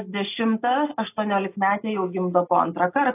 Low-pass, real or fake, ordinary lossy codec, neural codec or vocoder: 3.6 kHz; real; AAC, 24 kbps; none